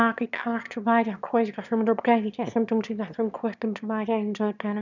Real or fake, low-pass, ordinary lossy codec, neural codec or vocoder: fake; 7.2 kHz; none; autoencoder, 22.05 kHz, a latent of 192 numbers a frame, VITS, trained on one speaker